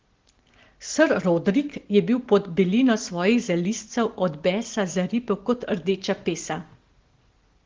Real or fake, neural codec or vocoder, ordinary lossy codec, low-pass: real; none; Opus, 16 kbps; 7.2 kHz